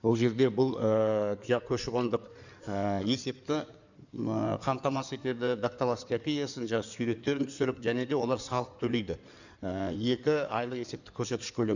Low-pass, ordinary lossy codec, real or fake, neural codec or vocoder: 7.2 kHz; none; fake; codec, 16 kHz in and 24 kHz out, 2.2 kbps, FireRedTTS-2 codec